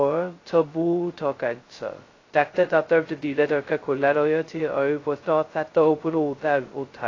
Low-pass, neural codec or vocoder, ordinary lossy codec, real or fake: 7.2 kHz; codec, 16 kHz, 0.2 kbps, FocalCodec; AAC, 32 kbps; fake